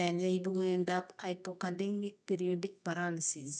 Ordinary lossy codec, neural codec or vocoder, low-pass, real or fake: none; codec, 24 kHz, 0.9 kbps, WavTokenizer, medium music audio release; 10.8 kHz; fake